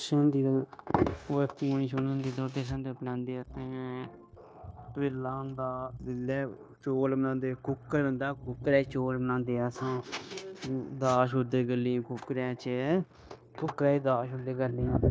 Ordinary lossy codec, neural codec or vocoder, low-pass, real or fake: none; codec, 16 kHz, 0.9 kbps, LongCat-Audio-Codec; none; fake